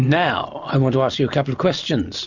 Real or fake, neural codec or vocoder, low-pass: real; none; 7.2 kHz